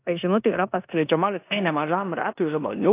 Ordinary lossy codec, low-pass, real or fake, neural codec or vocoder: AAC, 24 kbps; 3.6 kHz; fake; codec, 16 kHz in and 24 kHz out, 0.9 kbps, LongCat-Audio-Codec, four codebook decoder